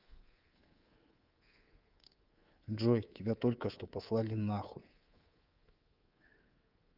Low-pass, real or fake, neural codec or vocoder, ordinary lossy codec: 5.4 kHz; fake; codec, 24 kHz, 3.1 kbps, DualCodec; Opus, 32 kbps